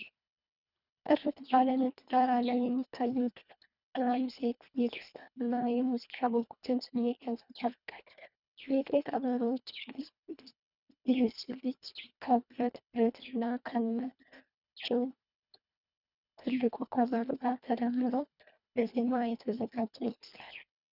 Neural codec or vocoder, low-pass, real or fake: codec, 24 kHz, 1.5 kbps, HILCodec; 5.4 kHz; fake